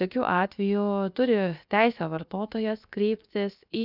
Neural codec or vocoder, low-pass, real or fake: codec, 16 kHz, 0.7 kbps, FocalCodec; 5.4 kHz; fake